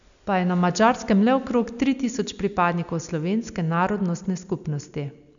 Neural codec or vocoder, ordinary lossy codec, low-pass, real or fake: none; none; 7.2 kHz; real